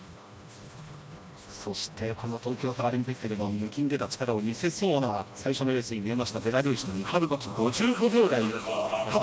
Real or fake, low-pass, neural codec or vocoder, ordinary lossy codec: fake; none; codec, 16 kHz, 1 kbps, FreqCodec, smaller model; none